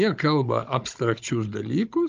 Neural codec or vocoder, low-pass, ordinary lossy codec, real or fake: codec, 16 kHz, 16 kbps, FunCodec, trained on Chinese and English, 50 frames a second; 7.2 kHz; Opus, 24 kbps; fake